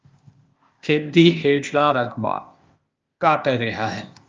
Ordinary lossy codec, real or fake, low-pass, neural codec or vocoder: Opus, 24 kbps; fake; 7.2 kHz; codec, 16 kHz, 0.8 kbps, ZipCodec